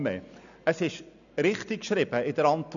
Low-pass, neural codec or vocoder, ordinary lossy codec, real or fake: 7.2 kHz; none; none; real